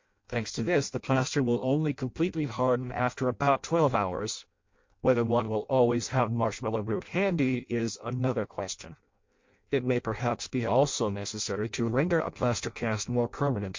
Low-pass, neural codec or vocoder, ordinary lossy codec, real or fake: 7.2 kHz; codec, 16 kHz in and 24 kHz out, 0.6 kbps, FireRedTTS-2 codec; MP3, 48 kbps; fake